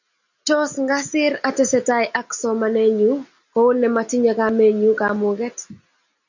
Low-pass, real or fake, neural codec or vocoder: 7.2 kHz; real; none